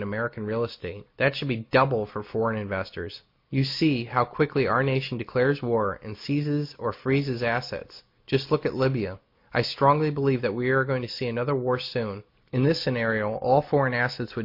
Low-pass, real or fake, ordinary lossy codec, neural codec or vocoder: 5.4 kHz; real; MP3, 32 kbps; none